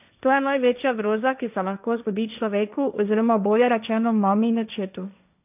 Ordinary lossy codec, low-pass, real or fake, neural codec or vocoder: none; 3.6 kHz; fake; codec, 16 kHz, 1.1 kbps, Voila-Tokenizer